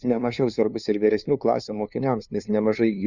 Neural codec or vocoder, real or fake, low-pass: codec, 16 kHz, 2 kbps, FunCodec, trained on LibriTTS, 25 frames a second; fake; 7.2 kHz